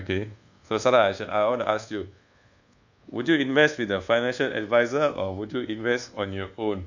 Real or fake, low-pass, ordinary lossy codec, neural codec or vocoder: fake; 7.2 kHz; none; codec, 24 kHz, 1.2 kbps, DualCodec